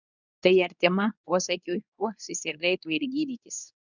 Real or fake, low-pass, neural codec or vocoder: fake; 7.2 kHz; codec, 24 kHz, 0.9 kbps, WavTokenizer, medium speech release version 2